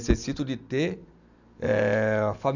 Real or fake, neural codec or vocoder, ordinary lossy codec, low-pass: real; none; none; 7.2 kHz